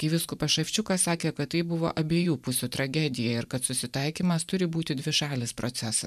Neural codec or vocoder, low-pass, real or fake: vocoder, 44.1 kHz, 128 mel bands every 512 samples, BigVGAN v2; 14.4 kHz; fake